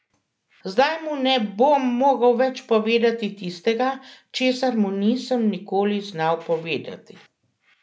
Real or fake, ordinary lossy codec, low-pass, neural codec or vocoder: real; none; none; none